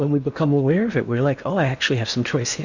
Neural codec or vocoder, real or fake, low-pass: codec, 16 kHz in and 24 kHz out, 0.8 kbps, FocalCodec, streaming, 65536 codes; fake; 7.2 kHz